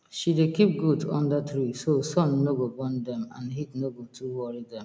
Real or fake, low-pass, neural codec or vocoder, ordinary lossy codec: real; none; none; none